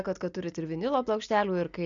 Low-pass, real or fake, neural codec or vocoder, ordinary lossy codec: 7.2 kHz; real; none; MP3, 64 kbps